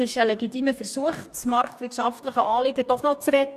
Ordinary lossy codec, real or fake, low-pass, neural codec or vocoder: none; fake; 14.4 kHz; codec, 44.1 kHz, 2.6 kbps, DAC